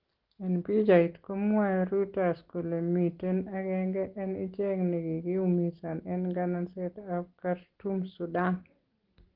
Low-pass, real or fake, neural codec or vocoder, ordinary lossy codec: 5.4 kHz; real; none; Opus, 16 kbps